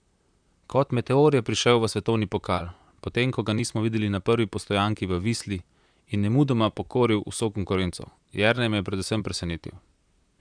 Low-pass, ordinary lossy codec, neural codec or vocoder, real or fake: 9.9 kHz; none; vocoder, 44.1 kHz, 128 mel bands, Pupu-Vocoder; fake